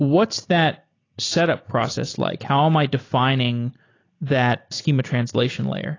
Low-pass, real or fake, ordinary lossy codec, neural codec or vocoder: 7.2 kHz; real; AAC, 32 kbps; none